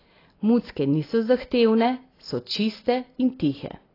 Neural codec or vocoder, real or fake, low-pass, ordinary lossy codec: none; real; 5.4 kHz; AAC, 24 kbps